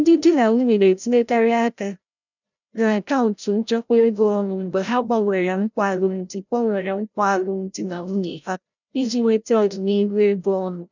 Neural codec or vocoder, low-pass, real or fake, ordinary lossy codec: codec, 16 kHz, 0.5 kbps, FreqCodec, larger model; 7.2 kHz; fake; none